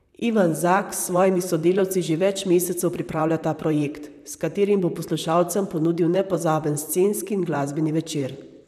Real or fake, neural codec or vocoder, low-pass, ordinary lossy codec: fake; vocoder, 44.1 kHz, 128 mel bands, Pupu-Vocoder; 14.4 kHz; none